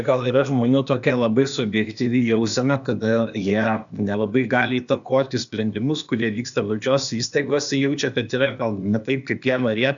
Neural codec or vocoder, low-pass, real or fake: codec, 16 kHz, 0.8 kbps, ZipCodec; 7.2 kHz; fake